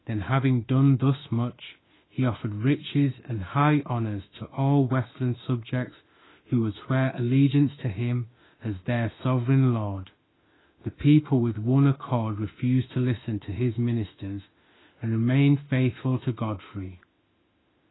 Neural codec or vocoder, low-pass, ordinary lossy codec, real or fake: autoencoder, 48 kHz, 32 numbers a frame, DAC-VAE, trained on Japanese speech; 7.2 kHz; AAC, 16 kbps; fake